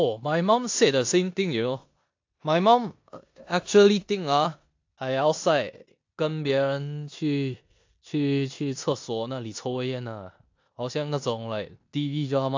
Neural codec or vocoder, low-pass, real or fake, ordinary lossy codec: codec, 16 kHz in and 24 kHz out, 0.9 kbps, LongCat-Audio-Codec, four codebook decoder; 7.2 kHz; fake; AAC, 48 kbps